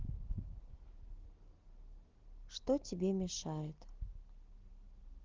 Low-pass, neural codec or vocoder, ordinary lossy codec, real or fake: 7.2 kHz; none; Opus, 16 kbps; real